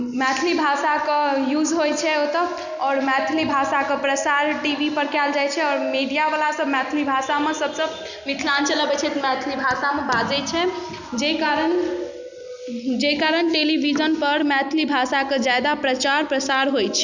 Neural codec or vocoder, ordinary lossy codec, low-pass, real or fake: none; none; 7.2 kHz; real